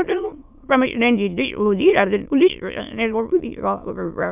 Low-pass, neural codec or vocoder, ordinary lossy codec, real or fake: 3.6 kHz; autoencoder, 22.05 kHz, a latent of 192 numbers a frame, VITS, trained on many speakers; none; fake